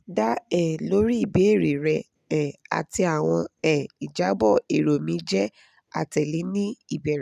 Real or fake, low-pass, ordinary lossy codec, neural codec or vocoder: real; 14.4 kHz; none; none